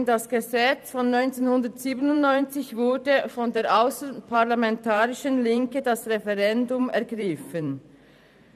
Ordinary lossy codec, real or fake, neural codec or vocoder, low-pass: none; fake; vocoder, 44.1 kHz, 128 mel bands every 512 samples, BigVGAN v2; 14.4 kHz